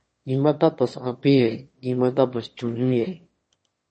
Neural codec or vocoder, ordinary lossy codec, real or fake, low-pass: autoencoder, 22.05 kHz, a latent of 192 numbers a frame, VITS, trained on one speaker; MP3, 32 kbps; fake; 9.9 kHz